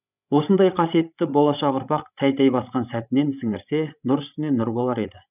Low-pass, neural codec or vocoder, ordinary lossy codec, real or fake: 3.6 kHz; codec, 16 kHz, 16 kbps, FreqCodec, larger model; none; fake